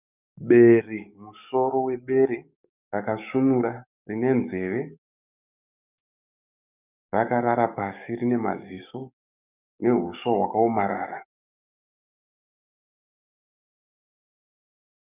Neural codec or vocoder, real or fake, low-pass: codec, 44.1 kHz, 7.8 kbps, DAC; fake; 3.6 kHz